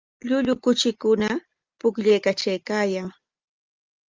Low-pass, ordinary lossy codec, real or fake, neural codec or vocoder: 7.2 kHz; Opus, 24 kbps; real; none